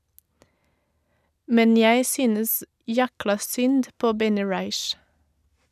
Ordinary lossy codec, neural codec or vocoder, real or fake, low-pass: none; none; real; 14.4 kHz